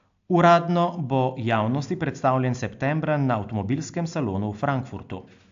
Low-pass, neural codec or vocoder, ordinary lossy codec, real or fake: 7.2 kHz; none; AAC, 64 kbps; real